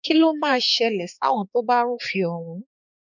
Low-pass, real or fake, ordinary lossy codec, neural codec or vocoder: 7.2 kHz; fake; none; codec, 16 kHz, 4 kbps, X-Codec, HuBERT features, trained on balanced general audio